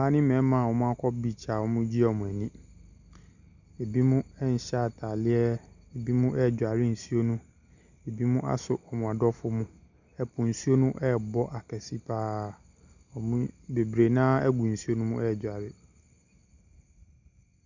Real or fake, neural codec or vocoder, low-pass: real; none; 7.2 kHz